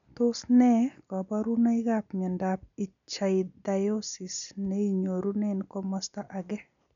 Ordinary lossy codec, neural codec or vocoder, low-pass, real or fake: none; none; 7.2 kHz; real